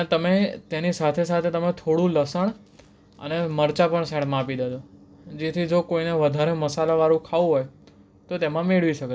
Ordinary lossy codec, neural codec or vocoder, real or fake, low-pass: none; none; real; none